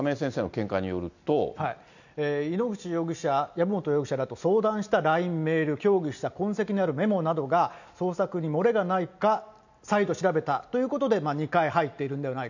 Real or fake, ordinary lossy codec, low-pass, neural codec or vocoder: real; none; 7.2 kHz; none